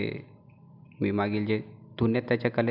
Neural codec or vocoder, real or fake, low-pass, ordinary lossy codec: none; real; 5.4 kHz; none